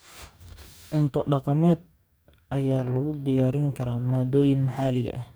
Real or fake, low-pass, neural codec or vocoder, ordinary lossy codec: fake; none; codec, 44.1 kHz, 2.6 kbps, DAC; none